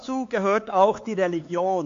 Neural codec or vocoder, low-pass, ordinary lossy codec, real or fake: codec, 16 kHz, 4 kbps, X-Codec, HuBERT features, trained on LibriSpeech; 7.2 kHz; AAC, 48 kbps; fake